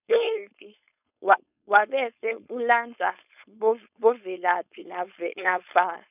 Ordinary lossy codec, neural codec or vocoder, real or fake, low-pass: none; codec, 16 kHz, 4.8 kbps, FACodec; fake; 3.6 kHz